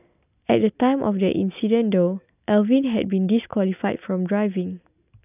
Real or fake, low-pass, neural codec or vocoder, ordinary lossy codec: real; 3.6 kHz; none; none